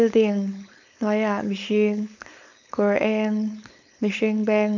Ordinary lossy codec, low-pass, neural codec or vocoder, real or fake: none; 7.2 kHz; codec, 16 kHz, 4.8 kbps, FACodec; fake